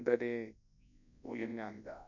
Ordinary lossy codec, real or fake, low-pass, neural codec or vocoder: none; fake; 7.2 kHz; codec, 24 kHz, 0.9 kbps, WavTokenizer, large speech release